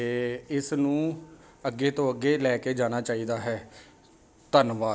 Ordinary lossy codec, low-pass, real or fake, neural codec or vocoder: none; none; real; none